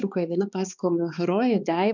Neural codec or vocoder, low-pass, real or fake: codec, 16 kHz, 2 kbps, X-Codec, HuBERT features, trained on balanced general audio; 7.2 kHz; fake